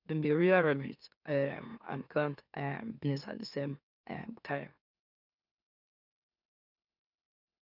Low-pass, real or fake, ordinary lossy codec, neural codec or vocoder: 5.4 kHz; fake; none; autoencoder, 44.1 kHz, a latent of 192 numbers a frame, MeloTTS